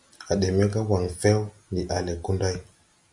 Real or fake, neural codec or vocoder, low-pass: real; none; 10.8 kHz